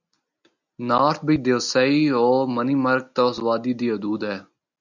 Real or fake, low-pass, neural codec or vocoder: real; 7.2 kHz; none